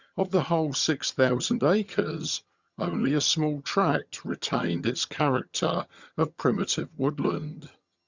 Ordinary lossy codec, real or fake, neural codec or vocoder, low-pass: Opus, 64 kbps; fake; vocoder, 22.05 kHz, 80 mel bands, HiFi-GAN; 7.2 kHz